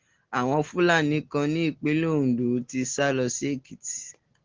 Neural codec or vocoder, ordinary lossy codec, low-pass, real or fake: none; Opus, 16 kbps; 7.2 kHz; real